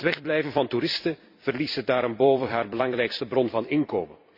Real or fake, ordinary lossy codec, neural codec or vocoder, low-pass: fake; MP3, 32 kbps; vocoder, 44.1 kHz, 128 mel bands every 256 samples, BigVGAN v2; 5.4 kHz